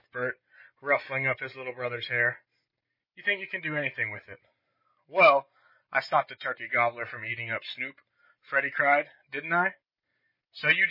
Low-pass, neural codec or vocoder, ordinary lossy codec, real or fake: 5.4 kHz; none; MP3, 24 kbps; real